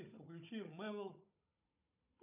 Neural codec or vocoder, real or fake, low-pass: codec, 16 kHz, 16 kbps, FunCodec, trained on Chinese and English, 50 frames a second; fake; 3.6 kHz